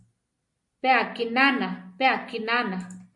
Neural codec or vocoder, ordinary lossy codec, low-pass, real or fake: none; MP3, 48 kbps; 10.8 kHz; real